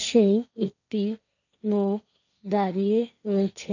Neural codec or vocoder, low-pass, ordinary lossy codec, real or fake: codec, 16 kHz, 1.1 kbps, Voila-Tokenizer; 7.2 kHz; none; fake